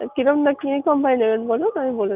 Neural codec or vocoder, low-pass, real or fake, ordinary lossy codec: none; 3.6 kHz; real; none